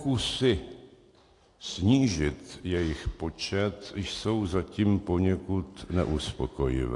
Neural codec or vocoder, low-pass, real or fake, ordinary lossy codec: none; 10.8 kHz; real; AAC, 48 kbps